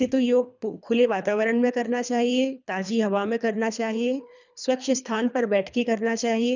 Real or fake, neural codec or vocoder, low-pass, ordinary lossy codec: fake; codec, 24 kHz, 3 kbps, HILCodec; 7.2 kHz; none